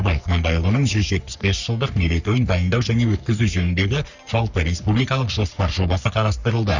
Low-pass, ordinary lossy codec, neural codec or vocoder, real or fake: 7.2 kHz; none; codec, 44.1 kHz, 3.4 kbps, Pupu-Codec; fake